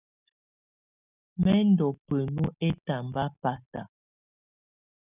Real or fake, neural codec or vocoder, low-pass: real; none; 3.6 kHz